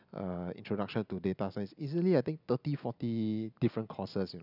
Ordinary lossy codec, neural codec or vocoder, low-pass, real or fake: none; none; 5.4 kHz; real